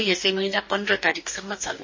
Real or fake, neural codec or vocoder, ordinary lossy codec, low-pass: fake; codec, 44.1 kHz, 2.6 kbps, DAC; MP3, 32 kbps; 7.2 kHz